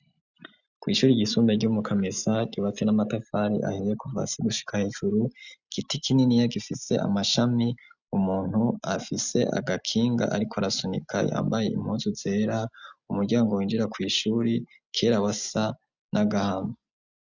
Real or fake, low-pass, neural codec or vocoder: real; 7.2 kHz; none